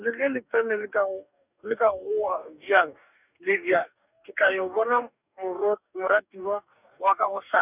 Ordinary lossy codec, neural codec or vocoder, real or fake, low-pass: none; codec, 44.1 kHz, 2.6 kbps, DAC; fake; 3.6 kHz